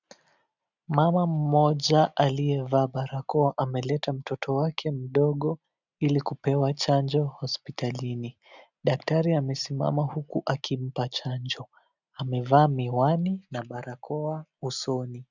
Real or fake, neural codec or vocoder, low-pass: real; none; 7.2 kHz